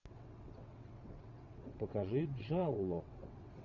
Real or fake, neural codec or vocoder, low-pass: fake; vocoder, 22.05 kHz, 80 mel bands, WaveNeXt; 7.2 kHz